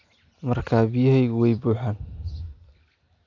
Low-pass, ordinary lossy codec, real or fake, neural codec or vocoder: 7.2 kHz; none; real; none